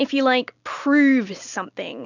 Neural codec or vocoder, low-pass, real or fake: none; 7.2 kHz; real